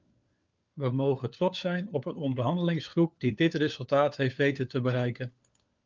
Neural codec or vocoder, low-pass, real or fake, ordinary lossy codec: codec, 16 kHz, 4 kbps, FunCodec, trained on LibriTTS, 50 frames a second; 7.2 kHz; fake; Opus, 32 kbps